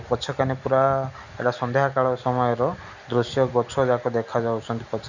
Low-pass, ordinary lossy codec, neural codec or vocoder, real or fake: 7.2 kHz; none; none; real